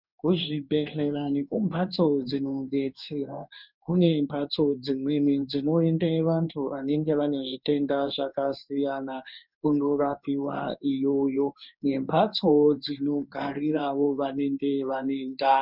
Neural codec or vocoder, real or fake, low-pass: codec, 16 kHz in and 24 kHz out, 1 kbps, XY-Tokenizer; fake; 5.4 kHz